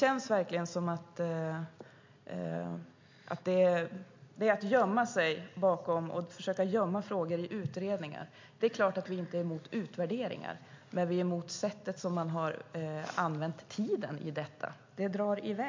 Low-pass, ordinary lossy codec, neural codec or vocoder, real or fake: 7.2 kHz; MP3, 48 kbps; none; real